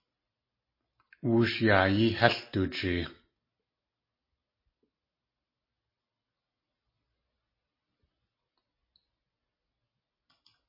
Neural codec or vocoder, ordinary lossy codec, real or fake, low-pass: none; MP3, 24 kbps; real; 5.4 kHz